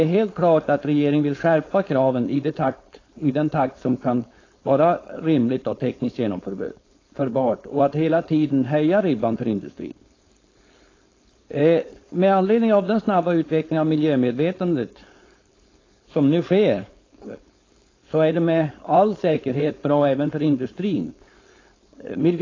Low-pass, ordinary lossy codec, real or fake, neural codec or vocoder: 7.2 kHz; AAC, 32 kbps; fake; codec, 16 kHz, 4.8 kbps, FACodec